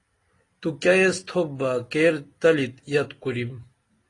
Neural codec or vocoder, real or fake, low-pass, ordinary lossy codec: none; real; 10.8 kHz; AAC, 32 kbps